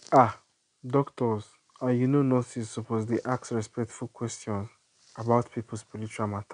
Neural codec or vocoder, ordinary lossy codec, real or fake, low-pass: none; none; real; 9.9 kHz